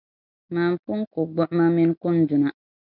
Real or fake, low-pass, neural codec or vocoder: real; 5.4 kHz; none